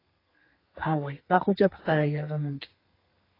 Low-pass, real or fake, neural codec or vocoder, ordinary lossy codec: 5.4 kHz; fake; codec, 32 kHz, 1.9 kbps, SNAC; AAC, 24 kbps